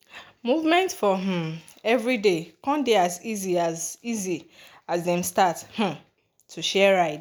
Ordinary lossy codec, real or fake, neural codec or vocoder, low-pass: none; real; none; none